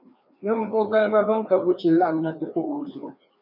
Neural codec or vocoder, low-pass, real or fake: codec, 16 kHz, 2 kbps, FreqCodec, larger model; 5.4 kHz; fake